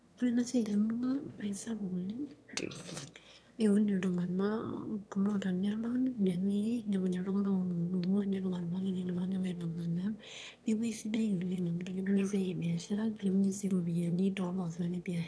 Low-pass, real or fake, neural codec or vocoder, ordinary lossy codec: none; fake; autoencoder, 22.05 kHz, a latent of 192 numbers a frame, VITS, trained on one speaker; none